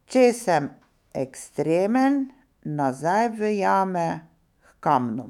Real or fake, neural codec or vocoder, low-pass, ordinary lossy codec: fake; autoencoder, 48 kHz, 128 numbers a frame, DAC-VAE, trained on Japanese speech; 19.8 kHz; none